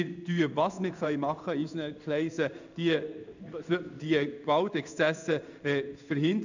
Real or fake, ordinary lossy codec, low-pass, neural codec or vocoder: fake; none; 7.2 kHz; codec, 16 kHz in and 24 kHz out, 1 kbps, XY-Tokenizer